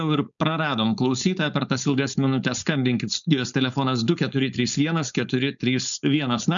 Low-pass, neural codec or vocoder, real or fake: 7.2 kHz; codec, 16 kHz, 4.8 kbps, FACodec; fake